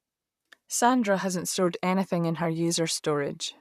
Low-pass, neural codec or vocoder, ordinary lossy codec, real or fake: 14.4 kHz; vocoder, 44.1 kHz, 128 mel bands, Pupu-Vocoder; none; fake